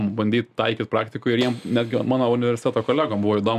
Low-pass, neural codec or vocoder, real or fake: 14.4 kHz; none; real